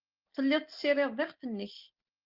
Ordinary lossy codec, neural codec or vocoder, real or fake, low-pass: Opus, 32 kbps; none; real; 5.4 kHz